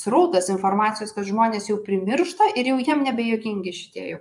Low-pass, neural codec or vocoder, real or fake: 10.8 kHz; vocoder, 24 kHz, 100 mel bands, Vocos; fake